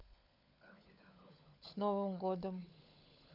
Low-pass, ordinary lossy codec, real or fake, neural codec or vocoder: 5.4 kHz; none; fake; codec, 16 kHz, 16 kbps, FunCodec, trained on LibriTTS, 50 frames a second